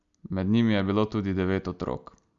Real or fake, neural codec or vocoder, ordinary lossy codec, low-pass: real; none; Opus, 64 kbps; 7.2 kHz